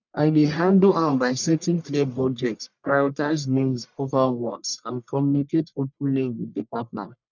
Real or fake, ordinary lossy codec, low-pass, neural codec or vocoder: fake; none; 7.2 kHz; codec, 44.1 kHz, 1.7 kbps, Pupu-Codec